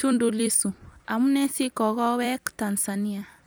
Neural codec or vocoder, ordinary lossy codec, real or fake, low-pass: vocoder, 44.1 kHz, 128 mel bands every 256 samples, BigVGAN v2; none; fake; none